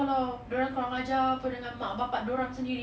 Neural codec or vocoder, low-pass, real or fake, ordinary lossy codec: none; none; real; none